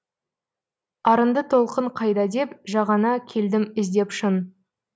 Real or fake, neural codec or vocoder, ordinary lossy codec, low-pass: real; none; none; none